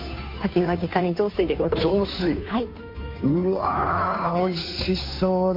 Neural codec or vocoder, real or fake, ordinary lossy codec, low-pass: codec, 16 kHz, 2 kbps, FunCodec, trained on Chinese and English, 25 frames a second; fake; MP3, 32 kbps; 5.4 kHz